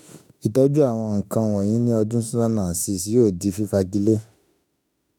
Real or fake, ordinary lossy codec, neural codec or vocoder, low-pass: fake; none; autoencoder, 48 kHz, 32 numbers a frame, DAC-VAE, trained on Japanese speech; none